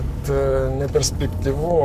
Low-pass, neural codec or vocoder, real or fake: 14.4 kHz; codec, 44.1 kHz, 7.8 kbps, Pupu-Codec; fake